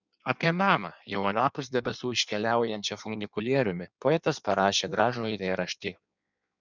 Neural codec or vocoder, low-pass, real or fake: codec, 16 kHz in and 24 kHz out, 1.1 kbps, FireRedTTS-2 codec; 7.2 kHz; fake